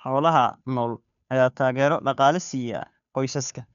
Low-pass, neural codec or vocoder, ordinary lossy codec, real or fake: 7.2 kHz; codec, 16 kHz, 2 kbps, FunCodec, trained on Chinese and English, 25 frames a second; none; fake